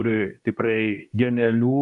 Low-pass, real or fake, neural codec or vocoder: 10.8 kHz; fake; codec, 24 kHz, 0.9 kbps, WavTokenizer, medium speech release version 2